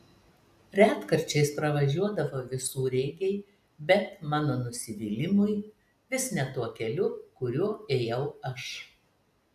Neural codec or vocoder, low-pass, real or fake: none; 14.4 kHz; real